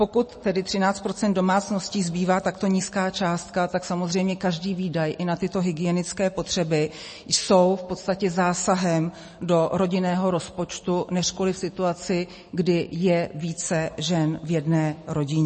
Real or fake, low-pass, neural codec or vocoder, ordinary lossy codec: real; 9.9 kHz; none; MP3, 32 kbps